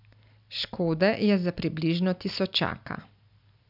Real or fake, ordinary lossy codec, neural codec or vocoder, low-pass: real; none; none; 5.4 kHz